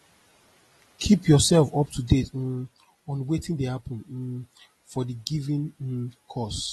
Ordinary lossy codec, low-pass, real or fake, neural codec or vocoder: AAC, 32 kbps; 19.8 kHz; real; none